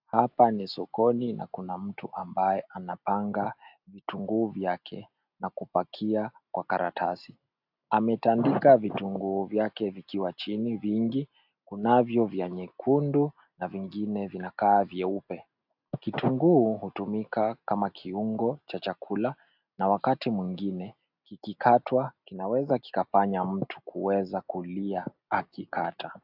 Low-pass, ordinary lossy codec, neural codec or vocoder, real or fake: 5.4 kHz; AAC, 48 kbps; none; real